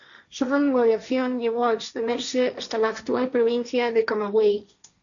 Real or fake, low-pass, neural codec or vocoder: fake; 7.2 kHz; codec, 16 kHz, 1.1 kbps, Voila-Tokenizer